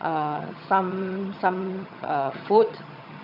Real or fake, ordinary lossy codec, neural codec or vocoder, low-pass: fake; none; vocoder, 22.05 kHz, 80 mel bands, HiFi-GAN; 5.4 kHz